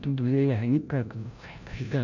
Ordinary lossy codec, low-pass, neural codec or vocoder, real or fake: none; 7.2 kHz; codec, 16 kHz, 0.5 kbps, FreqCodec, larger model; fake